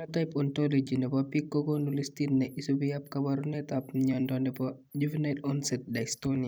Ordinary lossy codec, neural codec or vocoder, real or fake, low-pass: none; vocoder, 44.1 kHz, 128 mel bands every 256 samples, BigVGAN v2; fake; none